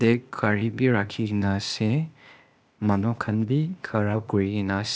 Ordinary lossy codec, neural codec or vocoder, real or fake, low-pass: none; codec, 16 kHz, 0.8 kbps, ZipCodec; fake; none